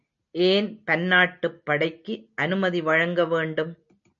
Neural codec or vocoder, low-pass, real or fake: none; 7.2 kHz; real